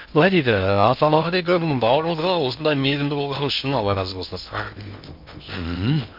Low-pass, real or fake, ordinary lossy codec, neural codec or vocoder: 5.4 kHz; fake; MP3, 48 kbps; codec, 16 kHz in and 24 kHz out, 0.6 kbps, FocalCodec, streaming, 2048 codes